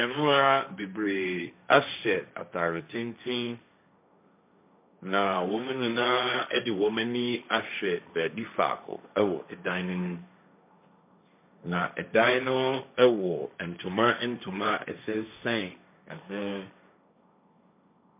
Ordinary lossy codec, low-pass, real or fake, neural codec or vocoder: MP3, 32 kbps; 3.6 kHz; fake; codec, 16 kHz, 1.1 kbps, Voila-Tokenizer